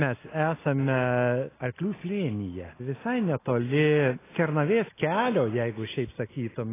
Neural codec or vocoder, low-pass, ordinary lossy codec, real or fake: none; 3.6 kHz; AAC, 16 kbps; real